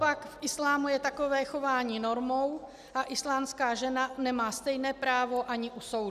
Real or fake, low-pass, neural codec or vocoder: real; 14.4 kHz; none